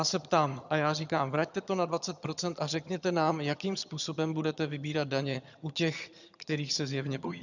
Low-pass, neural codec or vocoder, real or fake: 7.2 kHz; vocoder, 22.05 kHz, 80 mel bands, HiFi-GAN; fake